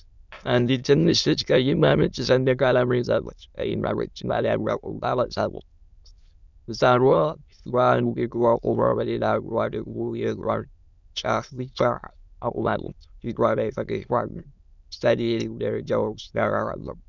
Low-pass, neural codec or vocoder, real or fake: 7.2 kHz; autoencoder, 22.05 kHz, a latent of 192 numbers a frame, VITS, trained on many speakers; fake